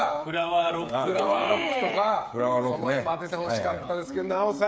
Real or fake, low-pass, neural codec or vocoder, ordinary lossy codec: fake; none; codec, 16 kHz, 8 kbps, FreqCodec, smaller model; none